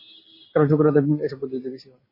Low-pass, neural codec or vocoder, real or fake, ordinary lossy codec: 5.4 kHz; none; real; MP3, 24 kbps